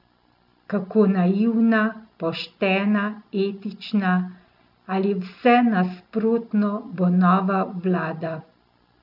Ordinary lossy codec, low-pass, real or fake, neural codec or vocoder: none; 5.4 kHz; real; none